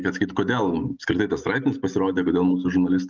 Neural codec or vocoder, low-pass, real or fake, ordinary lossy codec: none; 7.2 kHz; real; Opus, 32 kbps